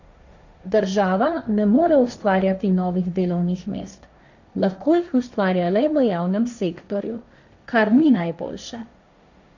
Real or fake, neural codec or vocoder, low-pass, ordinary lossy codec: fake; codec, 16 kHz, 1.1 kbps, Voila-Tokenizer; 7.2 kHz; none